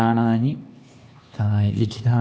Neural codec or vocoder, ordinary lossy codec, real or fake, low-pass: codec, 16 kHz, 2 kbps, X-Codec, HuBERT features, trained on LibriSpeech; none; fake; none